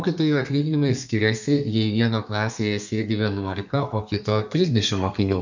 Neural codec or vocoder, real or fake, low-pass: codec, 32 kHz, 1.9 kbps, SNAC; fake; 7.2 kHz